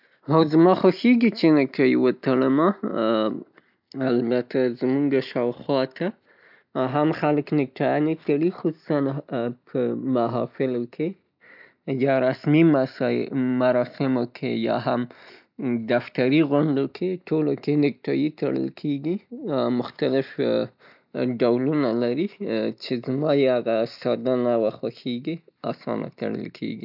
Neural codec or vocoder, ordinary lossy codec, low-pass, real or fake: none; none; 5.4 kHz; real